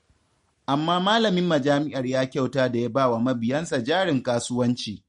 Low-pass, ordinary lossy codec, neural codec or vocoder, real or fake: 19.8 kHz; MP3, 48 kbps; none; real